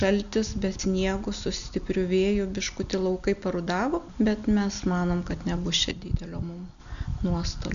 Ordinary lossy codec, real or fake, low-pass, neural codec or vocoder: MP3, 96 kbps; real; 7.2 kHz; none